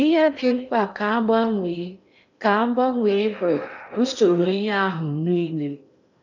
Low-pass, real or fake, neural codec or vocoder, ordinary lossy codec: 7.2 kHz; fake; codec, 16 kHz in and 24 kHz out, 0.8 kbps, FocalCodec, streaming, 65536 codes; none